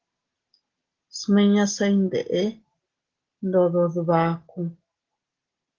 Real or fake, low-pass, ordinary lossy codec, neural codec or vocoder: real; 7.2 kHz; Opus, 16 kbps; none